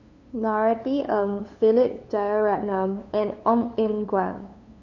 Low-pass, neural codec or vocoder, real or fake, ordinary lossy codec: 7.2 kHz; codec, 16 kHz, 2 kbps, FunCodec, trained on LibriTTS, 25 frames a second; fake; none